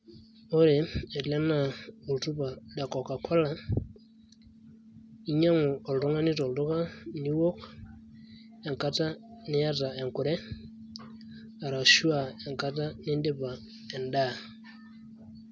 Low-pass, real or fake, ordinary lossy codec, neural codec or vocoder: none; real; none; none